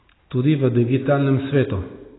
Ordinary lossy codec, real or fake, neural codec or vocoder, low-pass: AAC, 16 kbps; real; none; 7.2 kHz